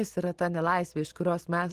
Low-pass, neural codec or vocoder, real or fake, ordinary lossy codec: 14.4 kHz; none; real; Opus, 16 kbps